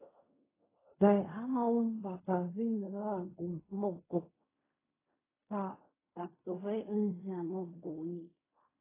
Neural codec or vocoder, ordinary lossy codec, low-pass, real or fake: codec, 16 kHz in and 24 kHz out, 0.4 kbps, LongCat-Audio-Codec, fine tuned four codebook decoder; AAC, 16 kbps; 3.6 kHz; fake